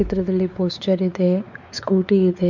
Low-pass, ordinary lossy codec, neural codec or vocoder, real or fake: 7.2 kHz; none; codec, 16 kHz, 4 kbps, X-Codec, WavLM features, trained on Multilingual LibriSpeech; fake